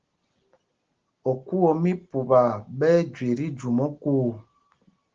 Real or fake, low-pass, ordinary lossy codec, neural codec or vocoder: real; 7.2 kHz; Opus, 16 kbps; none